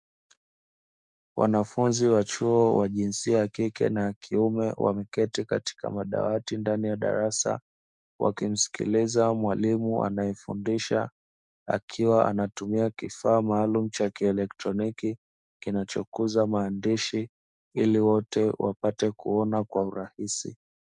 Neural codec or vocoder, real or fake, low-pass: codec, 44.1 kHz, 7.8 kbps, DAC; fake; 10.8 kHz